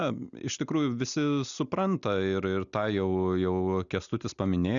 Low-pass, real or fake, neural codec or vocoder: 7.2 kHz; real; none